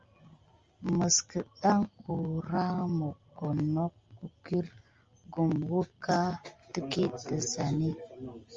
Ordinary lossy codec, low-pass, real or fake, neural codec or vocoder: Opus, 32 kbps; 7.2 kHz; real; none